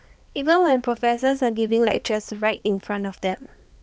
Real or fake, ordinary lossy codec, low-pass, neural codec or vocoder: fake; none; none; codec, 16 kHz, 2 kbps, X-Codec, HuBERT features, trained on balanced general audio